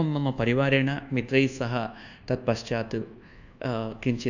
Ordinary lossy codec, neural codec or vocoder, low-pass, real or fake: none; codec, 24 kHz, 1.2 kbps, DualCodec; 7.2 kHz; fake